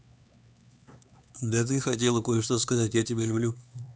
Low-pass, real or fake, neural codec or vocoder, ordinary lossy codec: none; fake; codec, 16 kHz, 4 kbps, X-Codec, HuBERT features, trained on LibriSpeech; none